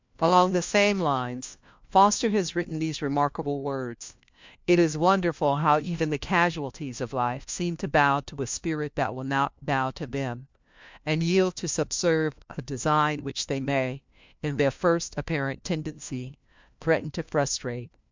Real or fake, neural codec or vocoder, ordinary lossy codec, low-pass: fake; codec, 16 kHz, 1 kbps, FunCodec, trained on LibriTTS, 50 frames a second; MP3, 64 kbps; 7.2 kHz